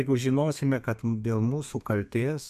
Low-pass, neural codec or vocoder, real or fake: 14.4 kHz; codec, 32 kHz, 1.9 kbps, SNAC; fake